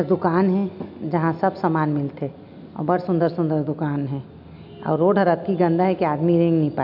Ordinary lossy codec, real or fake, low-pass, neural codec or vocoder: none; real; 5.4 kHz; none